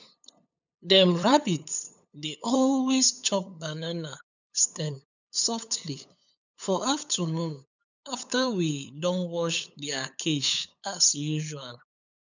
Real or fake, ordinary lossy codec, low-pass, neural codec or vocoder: fake; none; 7.2 kHz; codec, 16 kHz, 8 kbps, FunCodec, trained on LibriTTS, 25 frames a second